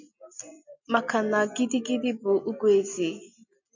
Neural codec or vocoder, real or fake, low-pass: none; real; 7.2 kHz